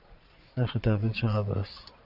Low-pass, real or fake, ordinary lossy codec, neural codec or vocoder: 5.4 kHz; fake; Opus, 64 kbps; vocoder, 22.05 kHz, 80 mel bands, Vocos